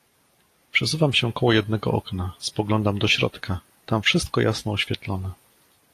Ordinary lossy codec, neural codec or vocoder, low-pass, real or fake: AAC, 48 kbps; none; 14.4 kHz; real